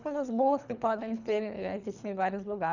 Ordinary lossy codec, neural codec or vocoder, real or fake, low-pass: none; codec, 24 kHz, 3 kbps, HILCodec; fake; 7.2 kHz